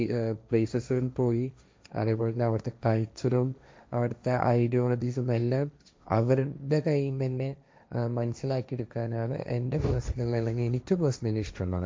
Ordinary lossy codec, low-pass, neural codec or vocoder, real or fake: none; none; codec, 16 kHz, 1.1 kbps, Voila-Tokenizer; fake